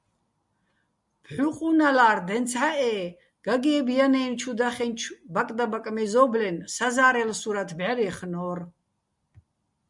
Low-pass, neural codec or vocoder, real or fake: 10.8 kHz; none; real